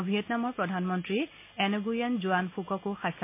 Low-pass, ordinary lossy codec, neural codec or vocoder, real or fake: 3.6 kHz; MP3, 24 kbps; none; real